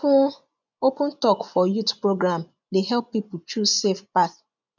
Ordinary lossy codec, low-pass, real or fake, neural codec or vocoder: none; 7.2 kHz; fake; vocoder, 24 kHz, 100 mel bands, Vocos